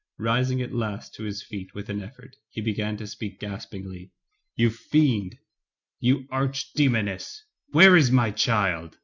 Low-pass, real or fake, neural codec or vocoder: 7.2 kHz; real; none